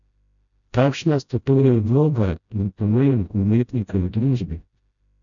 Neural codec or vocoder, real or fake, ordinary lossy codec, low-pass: codec, 16 kHz, 0.5 kbps, FreqCodec, smaller model; fake; none; 7.2 kHz